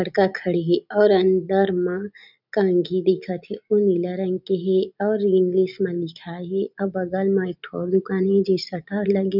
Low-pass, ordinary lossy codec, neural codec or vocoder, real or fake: 5.4 kHz; none; none; real